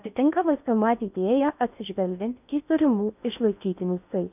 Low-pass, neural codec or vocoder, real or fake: 3.6 kHz; codec, 16 kHz in and 24 kHz out, 0.6 kbps, FocalCodec, streaming, 4096 codes; fake